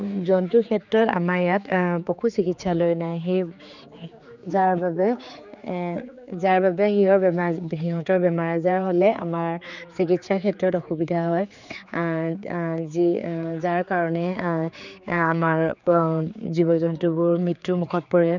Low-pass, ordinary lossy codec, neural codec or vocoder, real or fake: 7.2 kHz; none; codec, 16 kHz, 4 kbps, X-Codec, HuBERT features, trained on general audio; fake